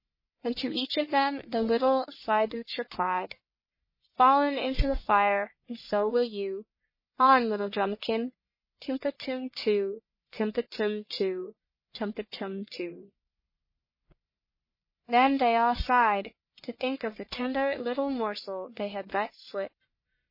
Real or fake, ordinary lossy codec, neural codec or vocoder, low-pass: fake; MP3, 24 kbps; codec, 44.1 kHz, 1.7 kbps, Pupu-Codec; 5.4 kHz